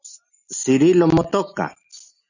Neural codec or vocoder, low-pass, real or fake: none; 7.2 kHz; real